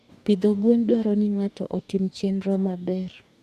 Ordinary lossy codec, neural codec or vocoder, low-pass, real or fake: none; codec, 44.1 kHz, 2.6 kbps, DAC; 14.4 kHz; fake